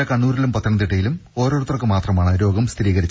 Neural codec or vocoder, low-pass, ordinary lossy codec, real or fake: none; none; none; real